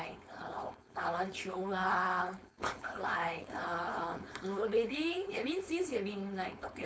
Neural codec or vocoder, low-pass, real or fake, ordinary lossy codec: codec, 16 kHz, 4.8 kbps, FACodec; none; fake; none